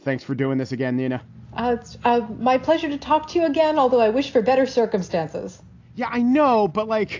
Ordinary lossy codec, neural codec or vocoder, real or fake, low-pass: AAC, 48 kbps; none; real; 7.2 kHz